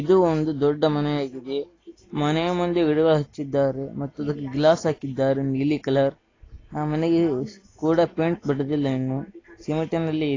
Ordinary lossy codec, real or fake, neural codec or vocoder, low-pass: AAC, 32 kbps; real; none; 7.2 kHz